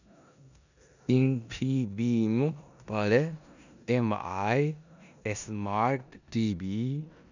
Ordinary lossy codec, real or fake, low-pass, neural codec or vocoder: none; fake; 7.2 kHz; codec, 16 kHz in and 24 kHz out, 0.9 kbps, LongCat-Audio-Codec, four codebook decoder